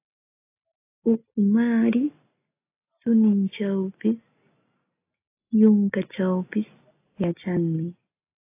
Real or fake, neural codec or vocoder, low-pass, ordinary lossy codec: real; none; 3.6 kHz; AAC, 24 kbps